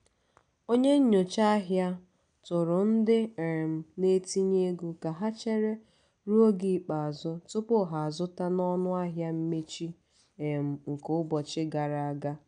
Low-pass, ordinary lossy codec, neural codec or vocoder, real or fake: 9.9 kHz; MP3, 96 kbps; none; real